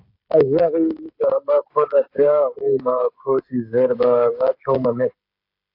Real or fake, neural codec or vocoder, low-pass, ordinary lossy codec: fake; codec, 16 kHz, 16 kbps, FreqCodec, smaller model; 5.4 kHz; AAC, 32 kbps